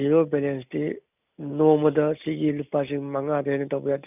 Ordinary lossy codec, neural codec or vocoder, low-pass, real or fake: none; none; 3.6 kHz; real